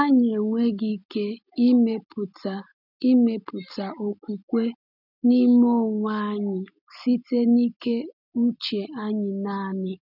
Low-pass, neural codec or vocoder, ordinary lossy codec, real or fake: 5.4 kHz; none; none; real